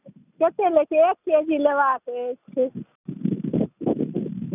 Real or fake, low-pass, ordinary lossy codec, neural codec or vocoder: real; 3.6 kHz; none; none